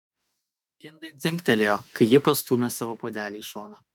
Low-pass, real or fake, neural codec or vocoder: 19.8 kHz; fake; autoencoder, 48 kHz, 32 numbers a frame, DAC-VAE, trained on Japanese speech